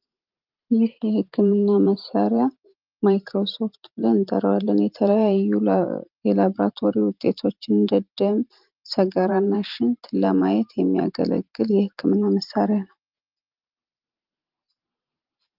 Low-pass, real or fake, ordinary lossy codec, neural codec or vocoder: 5.4 kHz; fake; Opus, 24 kbps; vocoder, 44.1 kHz, 128 mel bands every 512 samples, BigVGAN v2